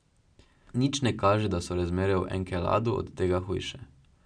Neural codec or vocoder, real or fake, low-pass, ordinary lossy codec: none; real; 9.9 kHz; none